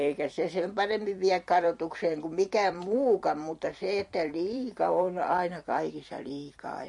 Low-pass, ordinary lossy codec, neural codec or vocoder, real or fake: 10.8 kHz; MP3, 48 kbps; vocoder, 44.1 kHz, 128 mel bands every 256 samples, BigVGAN v2; fake